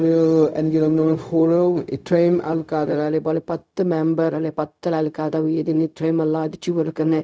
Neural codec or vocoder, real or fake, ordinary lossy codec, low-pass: codec, 16 kHz, 0.4 kbps, LongCat-Audio-Codec; fake; none; none